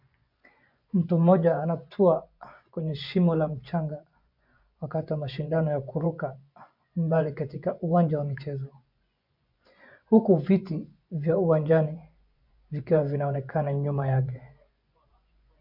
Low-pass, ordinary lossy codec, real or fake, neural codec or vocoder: 5.4 kHz; MP3, 48 kbps; fake; vocoder, 24 kHz, 100 mel bands, Vocos